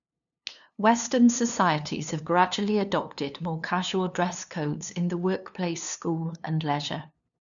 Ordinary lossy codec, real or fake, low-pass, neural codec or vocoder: none; fake; 7.2 kHz; codec, 16 kHz, 2 kbps, FunCodec, trained on LibriTTS, 25 frames a second